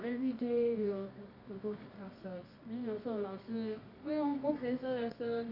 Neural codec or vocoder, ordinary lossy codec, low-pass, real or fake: codec, 24 kHz, 0.9 kbps, WavTokenizer, medium music audio release; none; 5.4 kHz; fake